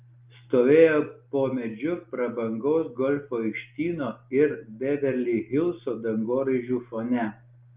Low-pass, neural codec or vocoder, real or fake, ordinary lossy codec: 3.6 kHz; none; real; Opus, 24 kbps